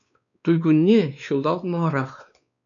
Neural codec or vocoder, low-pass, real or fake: codec, 16 kHz, 2 kbps, X-Codec, WavLM features, trained on Multilingual LibriSpeech; 7.2 kHz; fake